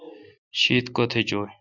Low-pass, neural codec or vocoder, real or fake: 7.2 kHz; none; real